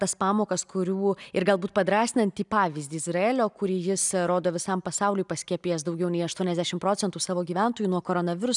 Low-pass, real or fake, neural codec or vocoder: 10.8 kHz; real; none